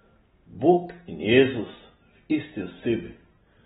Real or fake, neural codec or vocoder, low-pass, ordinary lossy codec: real; none; 19.8 kHz; AAC, 16 kbps